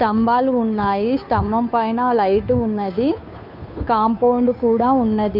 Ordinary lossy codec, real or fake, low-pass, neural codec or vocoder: none; fake; 5.4 kHz; codec, 16 kHz, 2 kbps, FunCodec, trained on Chinese and English, 25 frames a second